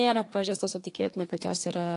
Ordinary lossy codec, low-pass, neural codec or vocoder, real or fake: AAC, 48 kbps; 10.8 kHz; codec, 24 kHz, 1 kbps, SNAC; fake